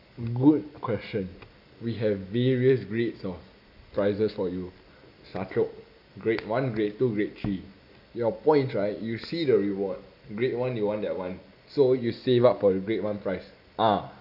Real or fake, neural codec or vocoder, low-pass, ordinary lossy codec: real; none; 5.4 kHz; none